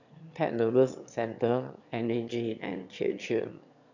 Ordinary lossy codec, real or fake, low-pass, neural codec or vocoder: none; fake; 7.2 kHz; autoencoder, 22.05 kHz, a latent of 192 numbers a frame, VITS, trained on one speaker